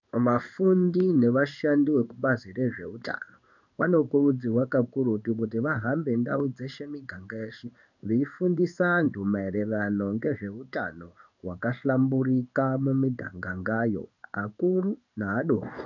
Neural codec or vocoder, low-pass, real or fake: codec, 16 kHz in and 24 kHz out, 1 kbps, XY-Tokenizer; 7.2 kHz; fake